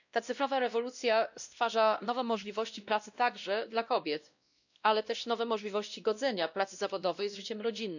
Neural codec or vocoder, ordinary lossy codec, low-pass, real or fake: codec, 16 kHz, 1 kbps, X-Codec, WavLM features, trained on Multilingual LibriSpeech; none; 7.2 kHz; fake